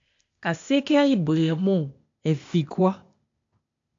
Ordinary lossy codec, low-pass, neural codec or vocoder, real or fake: AAC, 48 kbps; 7.2 kHz; codec, 16 kHz, 0.8 kbps, ZipCodec; fake